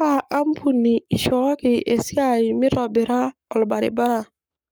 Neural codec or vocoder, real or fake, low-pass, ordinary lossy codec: codec, 44.1 kHz, 7.8 kbps, Pupu-Codec; fake; none; none